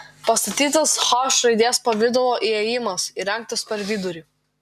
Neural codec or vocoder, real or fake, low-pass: none; real; 14.4 kHz